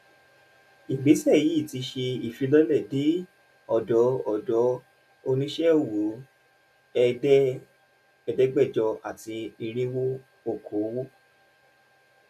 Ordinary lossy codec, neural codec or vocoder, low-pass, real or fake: none; none; 14.4 kHz; real